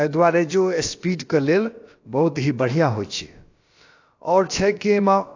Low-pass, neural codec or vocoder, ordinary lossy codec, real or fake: 7.2 kHz; codec, 16 kHz, about 1 kbps, DyCAST, with the encoder's durations; AAC, 48 kbps; fake